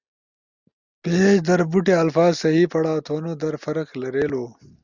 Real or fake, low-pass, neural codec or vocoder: real; 7.2 kHz; none